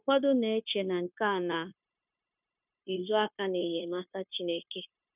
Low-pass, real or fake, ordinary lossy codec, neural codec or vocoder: 3.6 kHz; fake; none; codec, 16 kHz, 0.9 kbps, LongCat-Audio-Codec